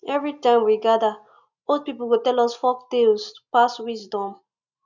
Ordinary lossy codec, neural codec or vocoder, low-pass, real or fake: none; none; 7.2 kHz; real